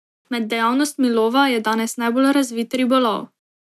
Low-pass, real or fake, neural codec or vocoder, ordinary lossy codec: 14.4 kHz; real; none; none